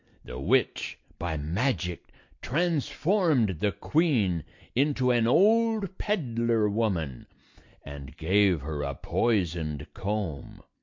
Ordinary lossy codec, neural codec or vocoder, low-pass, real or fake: MP3, 48 kbps; none; 7.2 kHz; real